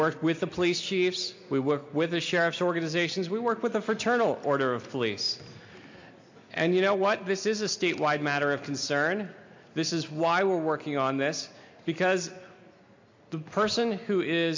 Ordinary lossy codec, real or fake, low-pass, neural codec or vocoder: MP3, 48 kbps; real; 7.2 kHz; none